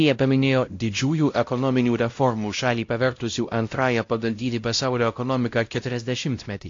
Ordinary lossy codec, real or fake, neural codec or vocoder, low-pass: AAC, 48 kbps; fake; codec, 16 kHz, 0.5 kbps, X-Codec, WavLM features, trained on Multilingual LibriSpeech; 7.2 kHz